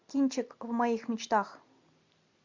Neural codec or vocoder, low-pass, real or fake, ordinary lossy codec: none; 7.2 kHz; real; MP3, 64 kbps